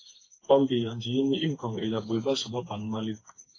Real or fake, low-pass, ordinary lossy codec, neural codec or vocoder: fake; 7.2 kHz; AAC, 32 kbps; codec, 16 kHz, 4 kbps, FreqCodec, smaller model